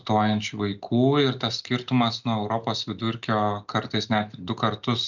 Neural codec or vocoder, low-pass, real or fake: none; 7.2 kHz; real